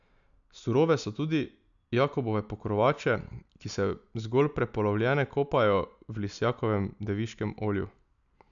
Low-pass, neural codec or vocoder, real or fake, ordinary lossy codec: 7.2 kHz; none; real; none